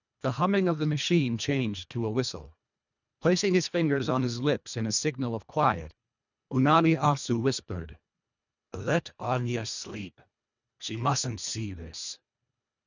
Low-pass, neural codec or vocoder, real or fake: 7.2 kHz; codec, 24 kHz, 1.5 kbps, HILCodec; fake